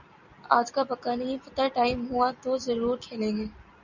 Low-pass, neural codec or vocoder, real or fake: 7.2 kHz; none; real